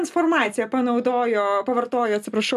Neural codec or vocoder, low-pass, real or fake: none; 14.4 kHz; real